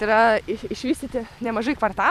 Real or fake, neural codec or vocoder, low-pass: fake; vocoder, 44.1 kHz, 128 mel bands every 256 samples, BigVGAN v2; 14.4 kHz